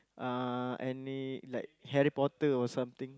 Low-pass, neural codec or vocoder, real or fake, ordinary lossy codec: none; none; real; none